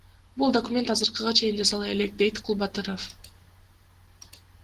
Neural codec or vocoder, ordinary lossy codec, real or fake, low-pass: none; Opus, 16 kbps; real; 14.4 kHz